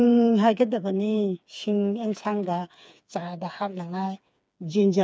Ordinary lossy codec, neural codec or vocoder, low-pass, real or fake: none; codec, 16 kHz, 4 kbps, FreqCodec, smaller model; none; fake